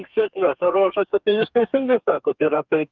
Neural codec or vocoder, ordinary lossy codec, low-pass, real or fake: codec, 32 kHz, 1.9 kbps, SNAC; Opus, 32 kbps; 7.2 kHz; fake